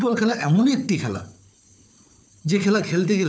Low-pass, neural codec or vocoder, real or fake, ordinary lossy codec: none; codec, 16 kHz, 4 kbps, FunCodec, trained on Chinese and English, 50 frames a second; fake; none